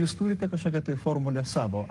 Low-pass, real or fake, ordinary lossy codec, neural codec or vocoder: 10.8 kHz; fake; Opus, 32 kbps; codec, 44.1 kHz, 7.8 kbps, Pupu-Codec